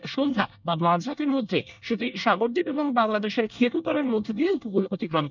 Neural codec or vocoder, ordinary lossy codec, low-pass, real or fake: codec, 24 kHz, 1 kbps, SNAC; none; 7.2 kHz; fake